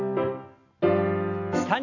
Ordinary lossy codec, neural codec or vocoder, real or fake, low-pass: none; none; real; 7.2 kHz